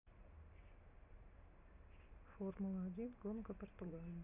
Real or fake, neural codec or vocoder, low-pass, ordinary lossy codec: real; none; 3.6 kHz; none